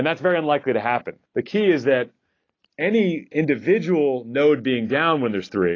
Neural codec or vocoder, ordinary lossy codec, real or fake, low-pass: none; AAC, 32 kbps; real; 7.2 kHz